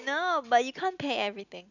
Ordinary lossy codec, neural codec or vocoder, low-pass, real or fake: none; none; 7.2 kHz; real